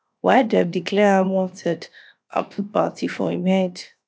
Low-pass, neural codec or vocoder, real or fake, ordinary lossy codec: none; codec, 16 kHz, 0.7 kbps, FocalCodec; fake; none